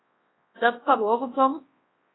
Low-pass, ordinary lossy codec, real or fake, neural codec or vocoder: 7.2 kHz; AAC, 16 kbps; fake; codec, 24 kHz, 0.9 kbps, WavTokenizer, large speech release